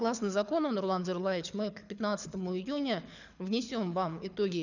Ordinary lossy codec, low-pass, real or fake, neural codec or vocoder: none; 7.2 kHz; fake; codec, 24 kHz, 6 kbps, HILCodec